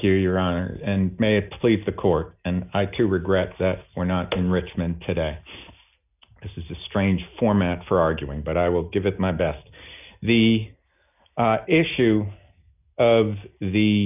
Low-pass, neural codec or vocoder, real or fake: 3.6 kHz; none; real